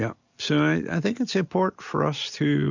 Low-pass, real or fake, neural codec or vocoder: 7.2 kHz; real; none